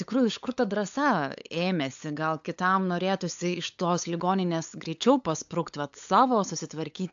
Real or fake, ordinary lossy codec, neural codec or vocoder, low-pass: fake; AAC, 64 kbps; codec, 16 kHz, 8 kbps, FunCodec, trained on LibriTTS, 25 frames a second; 7.2 kHz